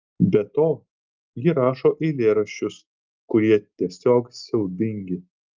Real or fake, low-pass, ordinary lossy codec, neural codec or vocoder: real; 7.2 kHz; Opus, 32 kbps; none